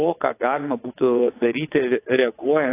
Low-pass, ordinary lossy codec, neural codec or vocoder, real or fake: 3.6 kHz; AAC, 24 kbps; vocoder, 22.05 kHz, 80 mel bands, WaveNeXt; fake